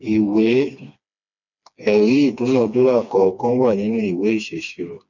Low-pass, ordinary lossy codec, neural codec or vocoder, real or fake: 7.2 kHz; none; codec, 16 kHz, 2 kbps, FreqCodec, smaller model; fake